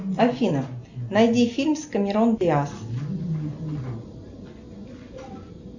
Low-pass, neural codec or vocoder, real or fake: 7.2 kHz; none; real